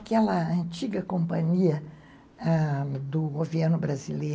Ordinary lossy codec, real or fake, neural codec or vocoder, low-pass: none; real; none; none